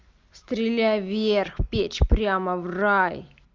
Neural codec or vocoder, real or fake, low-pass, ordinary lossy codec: none; real; 7.2 kHz; Opus, 32 kbps